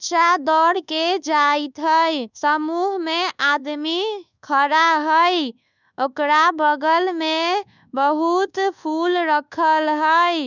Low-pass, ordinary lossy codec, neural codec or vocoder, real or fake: 7.2 kHz; none; codec, 24 kHz, 1.2 kbps, DualCodec; fake